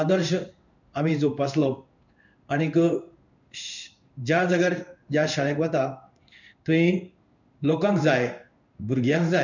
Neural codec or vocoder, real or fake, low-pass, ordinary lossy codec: codec, 16 kHz in and 24 kHz out, 1 kbps, XY-Tokenizer; fake; 7.2 kHz; none